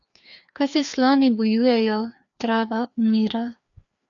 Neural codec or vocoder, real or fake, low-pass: codec, 16 kHz, 2 kbps, FreqCodec, larger model; fake; 7.2 kHz